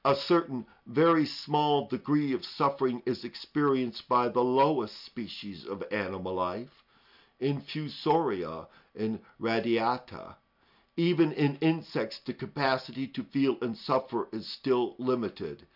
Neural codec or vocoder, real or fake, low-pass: none; real; 5.4 kHz